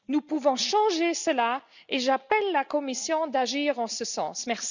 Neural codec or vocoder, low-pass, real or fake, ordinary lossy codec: none; 7.2 kHz; real; none